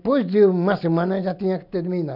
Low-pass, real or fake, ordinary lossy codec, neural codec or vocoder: 5.4 kHz; real; none; none